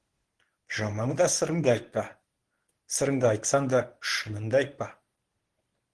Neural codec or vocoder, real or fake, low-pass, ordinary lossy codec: codec, 24 kHz, 0.9 kbps, WavTokenizer, medium speech release version 1; fake; 10.8 kHz; Opus, 16 kbps